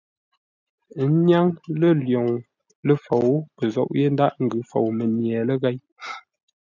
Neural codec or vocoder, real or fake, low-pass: none; real; 7.2 kHz